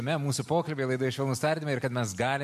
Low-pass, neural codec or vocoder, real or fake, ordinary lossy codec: 14.4 kHz; none; real; MP3, 64 kbps